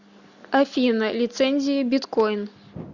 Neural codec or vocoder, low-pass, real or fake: none; 7.2 kHz; real